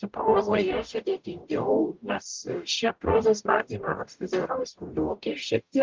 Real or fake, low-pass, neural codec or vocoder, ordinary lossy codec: fake; 7.2 kHz; codec, 44.1 kHz, 0.9 kbps, DAC; Opus, 32 kbps